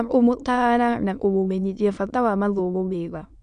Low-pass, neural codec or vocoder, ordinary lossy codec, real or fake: 9.9 kHz; autoencoder, 22.05 kHz, a latent of 192 numbers a frame, VITS, trained on many speakers; none; fake